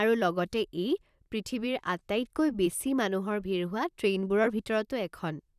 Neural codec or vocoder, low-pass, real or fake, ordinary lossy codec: vocoder, 44.1 kHz, 128 mel bands, Pupu-Vocoder; 14.4 kHz; fake; none